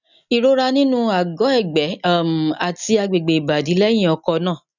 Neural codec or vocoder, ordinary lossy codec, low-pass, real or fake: none; none; 7.2 kHz; real